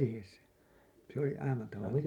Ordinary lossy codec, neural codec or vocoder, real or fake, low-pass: none; none; real; 19.8 kHz